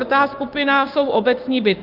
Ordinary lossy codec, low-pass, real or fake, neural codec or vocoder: Opus, 24 kbps; 5.4 kHz; fake; codec, 16 kHz, 6 kbps, DAC